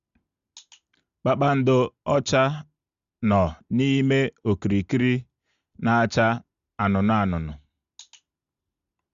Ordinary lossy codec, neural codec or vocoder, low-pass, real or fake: Opus, 64 kbps; none; 7.2 kHz; real